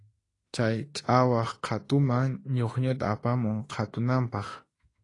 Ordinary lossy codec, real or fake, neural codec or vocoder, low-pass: AAC, 32 kbps; fake; autoencoder, 48 kHz, 32 numbers a frame, DAC-VAE, trained on Japanese speech; 10.8 kHz